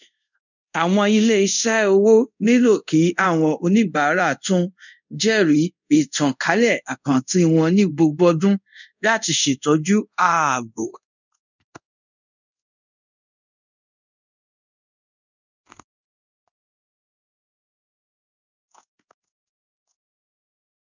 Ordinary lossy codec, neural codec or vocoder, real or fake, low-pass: none; codec, 24 kHz, 0.5 kbps, DualCodec; fake; 7.2 kHz